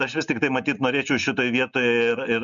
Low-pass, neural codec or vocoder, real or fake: 7.2 kHz; none; real